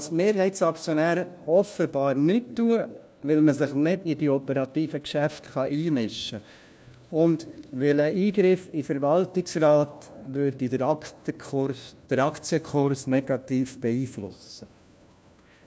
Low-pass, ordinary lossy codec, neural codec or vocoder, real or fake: none; none; codec, 16 kHz, 1 kbps, FunCodec, trained on LibriTTS, 50 frames a second; fake